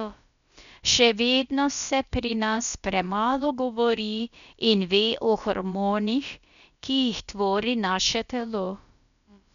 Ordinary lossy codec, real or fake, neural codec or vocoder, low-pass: none; fake; codec, 16 kHz, about 1 kbps, DyCAST, with the encoder's durations; 7.2 kHz